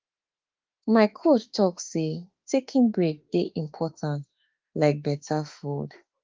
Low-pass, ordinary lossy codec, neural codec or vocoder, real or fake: 7.2 kHz; Opus, 32 kbps; autoencoder, 48 kHz, 32 numbers a frame, DAC-VAE, trained on Japanese speech; fake